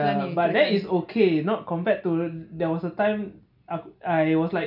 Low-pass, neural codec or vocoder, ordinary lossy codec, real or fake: 5.4 kHz; none; none; real